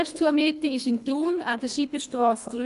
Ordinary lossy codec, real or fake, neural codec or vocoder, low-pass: none; fake; codec, 24 kHz, 1.5 kbps, HILCodec; 10.8 kHz